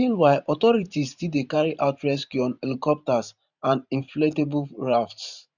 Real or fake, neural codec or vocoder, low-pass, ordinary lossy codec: fake; vocoder, 44.1 kHz, 128 mel bands every 512 samples, BigVGAN v2; 7.2 kHz; none